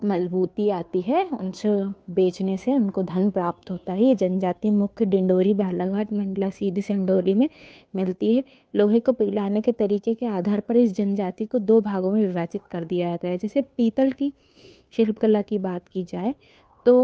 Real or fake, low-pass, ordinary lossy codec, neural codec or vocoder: fake; none; none; codec, 16 kHz, 2 kbps, FunCodec, trained on Chinese and English, 25 frames a second